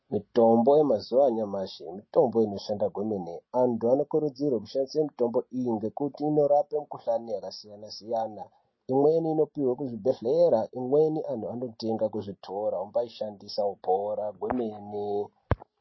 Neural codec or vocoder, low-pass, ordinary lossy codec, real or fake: none; 7.2 kHz; MP3, 24 kbps; real